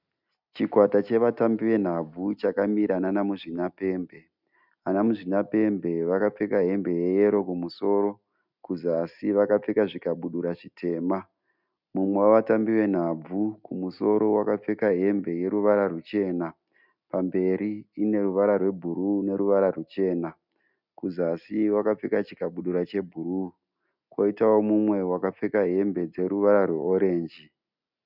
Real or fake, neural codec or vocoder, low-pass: real; none; 5.4 kHz